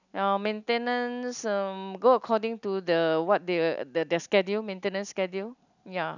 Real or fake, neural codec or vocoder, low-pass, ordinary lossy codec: real; none; 7.2 kHz; none